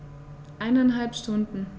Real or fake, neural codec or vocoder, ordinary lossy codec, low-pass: real; none; none; none